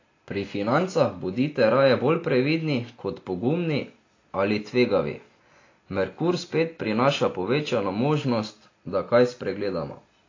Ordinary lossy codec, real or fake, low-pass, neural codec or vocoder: AAC, 32 kbps; real; 7.2 kHz; none